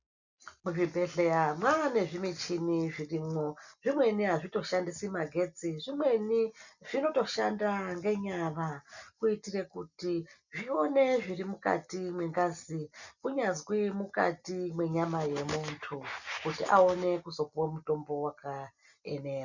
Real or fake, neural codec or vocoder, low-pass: real; none; 7.2 kHz